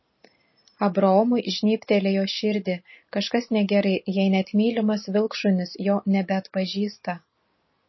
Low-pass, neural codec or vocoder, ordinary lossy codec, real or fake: 7.2 kHz; none; MP3, 24 kbps; real